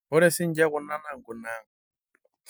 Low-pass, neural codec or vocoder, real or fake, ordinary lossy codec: none; none; real; none